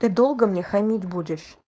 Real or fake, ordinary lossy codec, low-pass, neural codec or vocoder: fake; none; none; codec, 16 kHz, 4.8 kbps, FACodec